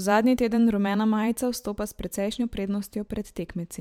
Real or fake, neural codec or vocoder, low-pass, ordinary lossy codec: fake; vocoder, 44.1 kHz, 128 mel bands every 512 samples, BigVGAN v2; 19.8 kHz; MP3, 96 kbps